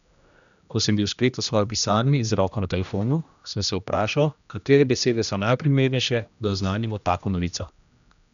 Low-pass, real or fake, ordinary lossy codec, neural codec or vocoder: 7.2 kHz; fake; none; codec, 16 kHz, 1 kbps, X-Codec, HuBERT features, trained on general audio